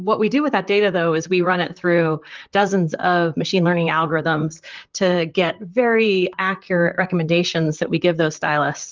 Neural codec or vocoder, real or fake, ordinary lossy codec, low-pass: vocoder, 44.1 kHz, 128 mel bands, Pupu-Vocoder; fake; Opus, 24 kbps; 7.2 kHz